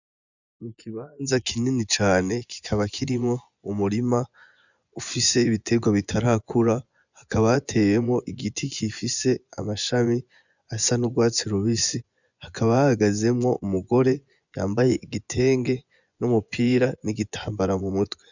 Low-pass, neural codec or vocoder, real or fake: 7.2 kHz; vocoder, 24 kHz, 100 mel bands, Vocos; fake